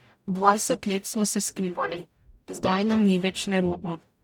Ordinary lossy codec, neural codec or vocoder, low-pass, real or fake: none; codec, 44.1 kHz, 0.9 kbps, DAC; 19.8 kHz; fake